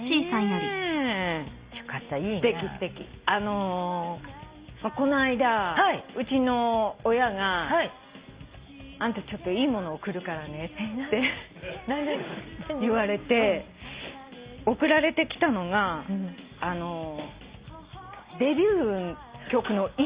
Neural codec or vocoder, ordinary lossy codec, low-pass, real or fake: none; Opus, 64 kbps; 3.6 kHz; real